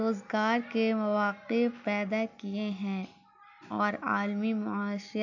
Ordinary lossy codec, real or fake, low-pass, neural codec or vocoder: none; real; 7.2 kHz; none